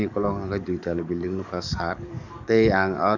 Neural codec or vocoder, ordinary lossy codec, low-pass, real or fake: codec, 44.1 kHz, 7.8 kbps, DAC; none; 7.2 kHz; fake